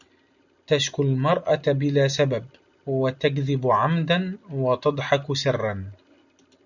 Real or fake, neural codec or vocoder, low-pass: real; none; 7.2 kHz